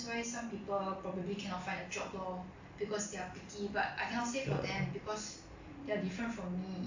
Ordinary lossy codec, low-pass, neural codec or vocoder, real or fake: AAC, 48 kbps; 7.2 kHz; none; real